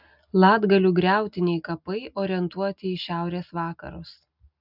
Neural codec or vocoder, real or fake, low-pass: none; real; 5.4 kHz